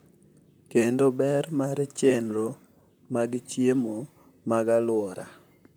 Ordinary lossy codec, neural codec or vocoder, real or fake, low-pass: none; vocoder, 44.1 kHz, 128 mel bands, Pupu-Vocoder; fake; none